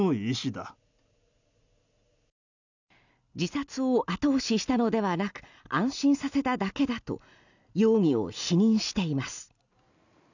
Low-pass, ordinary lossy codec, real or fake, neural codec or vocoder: 7.2 kHz; none; real; none